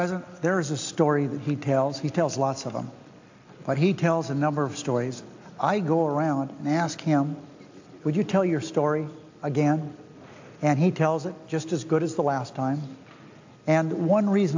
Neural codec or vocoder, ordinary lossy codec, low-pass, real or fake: none; AAC, 48 kbps; 7.2 kHz; real